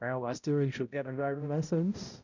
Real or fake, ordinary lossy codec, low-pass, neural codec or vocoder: fake; none; 7.2 kHz; codec, 16 kHz, 0.5 kbps, X-Codec, HuBERT features, trained on balanced general audio